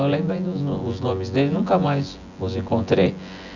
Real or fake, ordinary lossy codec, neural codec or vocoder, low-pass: fake; none; vocoder, 24 kHz, 100 mel bands, Vocos; 7.2 kHz